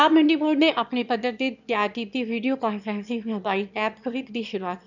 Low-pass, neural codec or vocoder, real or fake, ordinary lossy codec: 7.2 kHz; autoencoder, 22.05 kHz, a latent of 192 numbers a frame, VITS, trained on one speaker; fake; none